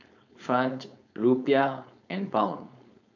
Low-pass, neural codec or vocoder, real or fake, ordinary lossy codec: 7.2 kHz; codec, 16 kHz, 4.8 kbps, FACodec; fake; none